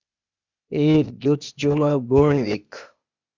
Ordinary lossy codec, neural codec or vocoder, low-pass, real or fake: Opus, 64 kbps; codec, 16 kHz, 0.8 kbps, ZipCodec; 7.2 kHz; fake